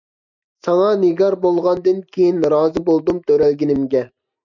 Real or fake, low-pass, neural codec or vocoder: real; 7.2 kHz; none